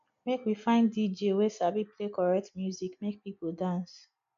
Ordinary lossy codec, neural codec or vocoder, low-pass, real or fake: AAC, 64 kbps; none; 7.2 kHz; real